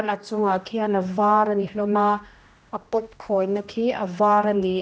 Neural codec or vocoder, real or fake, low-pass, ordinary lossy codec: codec, 16 kHz, 1 kbps, X-Codec, HuBERT features, trained on general audio; fake; none; none